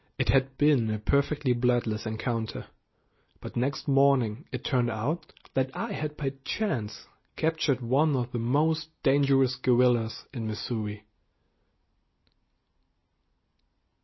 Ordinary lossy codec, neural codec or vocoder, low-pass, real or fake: MP3, 24 kbps; none; 7.2 kHz; real